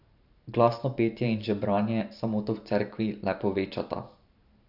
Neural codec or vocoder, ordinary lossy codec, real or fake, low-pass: vocoder, 44.1 kHz, 128 mel bands every 512 samples, BigVGAN v2; none; fake; 5.4 kHz